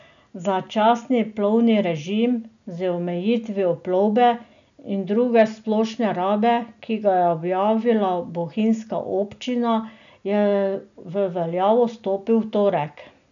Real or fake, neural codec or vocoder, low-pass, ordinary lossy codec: real; none; 7.2 kHz; none